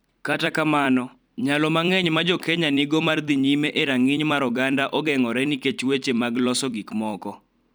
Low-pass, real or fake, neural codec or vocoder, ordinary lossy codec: none; fake; vocoder, 44.1 kHz, 128 mel bands every 256 samples, BigVGAN v2; none